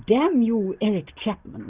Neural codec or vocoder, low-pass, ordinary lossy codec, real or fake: none; 3.6 kHz; Opus, 64 kbps; real